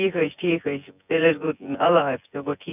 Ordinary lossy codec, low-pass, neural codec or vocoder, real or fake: none; 3.6 kHz; vocoder, 24 kHz, 100 mel bands, Vocos; fake